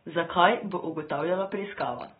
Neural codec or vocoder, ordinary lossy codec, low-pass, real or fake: none; AAC, 16 kbps; 19.8 kHz; real